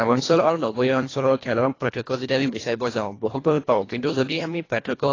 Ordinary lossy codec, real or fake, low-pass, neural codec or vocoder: AAC, 32 kbps; fake; 7.2 kHz; codec, 24 kHz, 1.5 kbps, HILCodec